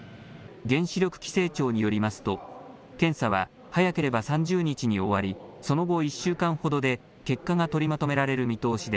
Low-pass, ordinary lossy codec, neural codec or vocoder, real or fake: none; none; none; real